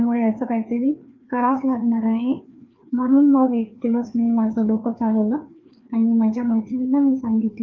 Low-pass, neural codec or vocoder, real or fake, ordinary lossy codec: 7.2 kHz; codec, 16 kHz, 2 kbps, FreqCodec, larger model; fake; Opus, 32 kbps